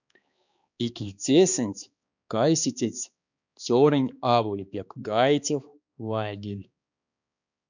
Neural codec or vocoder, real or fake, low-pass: codec, 16 kHz, 2 kbps, X-Codec, HuBERT features, trained on balanced general audio; fake; 7.2 kHz